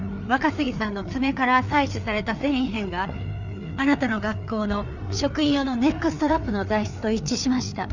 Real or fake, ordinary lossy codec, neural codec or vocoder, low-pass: fake; none; codec, 16 kHz, 4 kbps, FreqCodec, larger model; 7.2 kHz